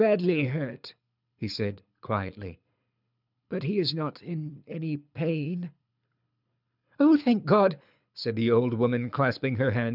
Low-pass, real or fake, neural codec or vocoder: 5.4 kHz; fake; codec, 24 kHz, 6 kbps, HILCodec